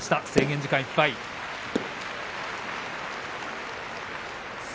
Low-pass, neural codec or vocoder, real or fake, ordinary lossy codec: none; none; real; none